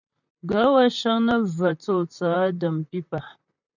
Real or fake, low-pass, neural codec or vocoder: fake; 7.2 kHz; vocoder, 44.1 kHz, 128 mel bands, Pupu-Vocoder